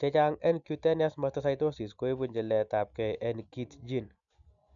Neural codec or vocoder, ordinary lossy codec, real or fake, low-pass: none; none; real; 7.2 kHz